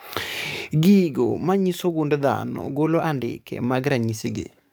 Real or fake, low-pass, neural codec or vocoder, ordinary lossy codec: fake; none; codec, 44.1 kHz, 7.8 kbps, DAC; none